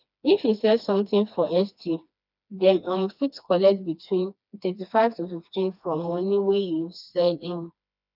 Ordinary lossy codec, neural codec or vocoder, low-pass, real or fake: none; codec, 16 kHz, 2 kbps, FreqCodec, smaller model; 5.4 kHz; fake